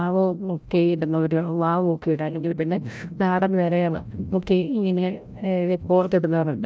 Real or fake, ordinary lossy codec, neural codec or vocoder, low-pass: fake; none; codec, 16 kHz, 0.5 kbps, FreqCodec, larger model; none